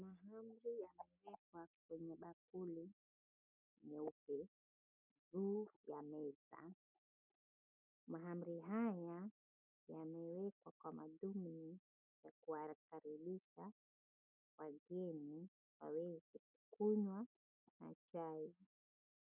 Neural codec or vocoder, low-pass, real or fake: none; 3.6 kHz; real